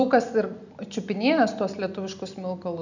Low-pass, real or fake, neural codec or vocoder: 7.2 kHz; real; none